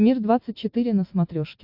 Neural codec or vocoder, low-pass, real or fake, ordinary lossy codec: none; 5.4 kHz; real; AAC, 48 kbps